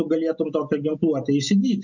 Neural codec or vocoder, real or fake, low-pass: none; real; 7.2 kHz